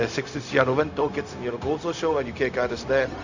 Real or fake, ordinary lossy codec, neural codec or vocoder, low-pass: fake; none; codec, 16 kHz, 0.4 kbps, LongCat-Audio-Codec; 7.2 kHz